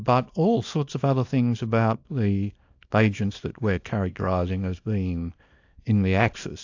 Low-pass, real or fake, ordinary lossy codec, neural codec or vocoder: 7.2 kHz; fake; AAC, 48 kbps; codec, 24 kHz, 0.9 kbps, WavTokenizer, small release